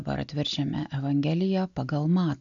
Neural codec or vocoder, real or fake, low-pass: none; real; 7.2 kHz